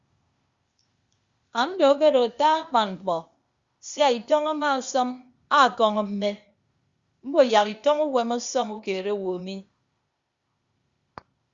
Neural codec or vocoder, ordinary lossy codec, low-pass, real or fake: codec, 16 kHz, 0.8 kbps, ZipCodec; Opus, 64 kbps; 7.2 kHz; fake